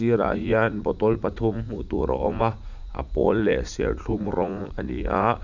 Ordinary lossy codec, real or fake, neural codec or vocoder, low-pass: none; fake; vocoder, 44.1 kHz, 80 mel bands, Vocos; 7.2 kHz